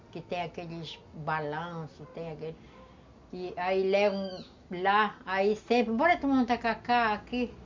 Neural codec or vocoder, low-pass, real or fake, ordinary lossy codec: none; 7.2 kHz; real; MP3, 64 kbps